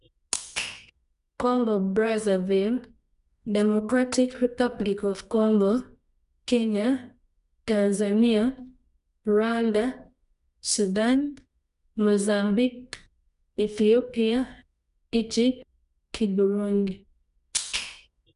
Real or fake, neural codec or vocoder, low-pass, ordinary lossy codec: fake; codec, 24 kHz, 0.9 kbps, WavTokenizer, medium music audio release; 10.8 kHz; none